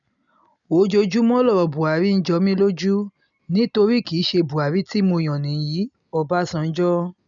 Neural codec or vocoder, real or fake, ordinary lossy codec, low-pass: none; real; none; 7.2 kHz